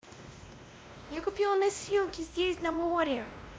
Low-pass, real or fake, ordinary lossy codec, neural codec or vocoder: none; fake; none; codec, 16 kHz, 1 kbps, X-Codec, WavLM features, trained on Multilingual LibriSpeech